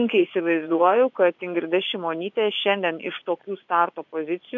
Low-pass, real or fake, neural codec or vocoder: 7.2 kHz; fake; vocoder, 24 kHz, 100 mel bands, Vocos